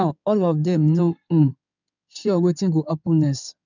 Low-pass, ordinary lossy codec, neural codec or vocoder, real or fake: 7.2 kHz; none; codec, 16 kHz in and 24 kHz out, 2.2 kbps, FireRedTTS-2 codec; fake